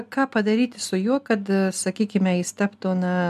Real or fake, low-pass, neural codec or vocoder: real; 14.4 kHz; none